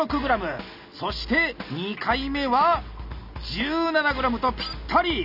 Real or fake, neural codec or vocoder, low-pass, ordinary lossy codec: real; none; 5.4 kHz; none